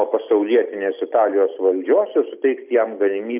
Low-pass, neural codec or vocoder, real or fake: 3.6 kHz; none; real